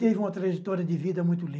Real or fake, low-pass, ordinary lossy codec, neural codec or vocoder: real; none; none; none